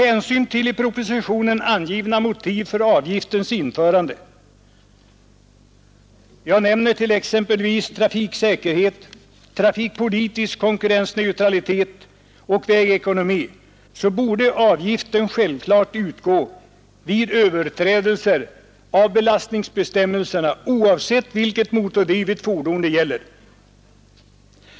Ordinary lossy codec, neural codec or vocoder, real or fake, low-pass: none; none; real; none